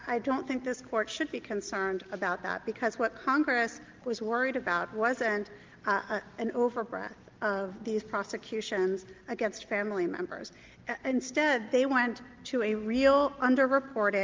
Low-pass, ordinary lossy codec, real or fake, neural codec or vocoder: 7.2 kHz; Opus, 32 kbps; real; none